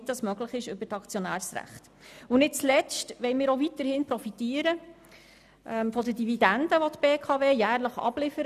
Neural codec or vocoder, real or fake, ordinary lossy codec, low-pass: none; real; none; 14.4 kHz